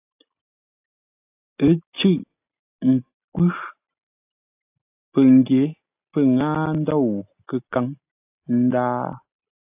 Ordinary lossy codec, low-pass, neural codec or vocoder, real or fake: AAC, 32 kbps; 3.6 kHz; none; real